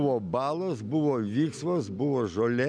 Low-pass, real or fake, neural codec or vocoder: 9.9 kHz; real; none